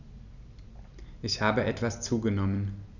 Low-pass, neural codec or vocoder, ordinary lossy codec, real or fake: 7.2 kHz; none; none; real